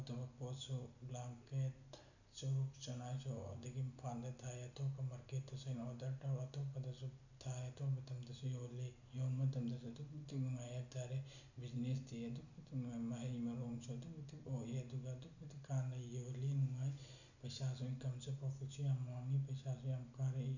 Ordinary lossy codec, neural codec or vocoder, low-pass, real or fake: none; none; 7.2 kHz; real